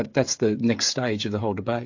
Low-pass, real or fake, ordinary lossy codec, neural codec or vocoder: 7.2 kHz; fake; AAC, 48 kbps; codec, 16 kHz, 16 kbps, FreqCodec, smaller model